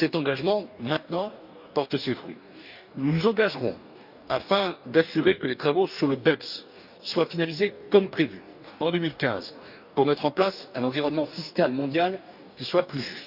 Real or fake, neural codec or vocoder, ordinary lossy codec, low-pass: fake; codec, 44.1 kHz, 2.6 kbps, DAC; none; 5.4 kHz